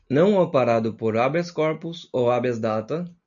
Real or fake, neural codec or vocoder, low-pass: real; none; 7.2 kHz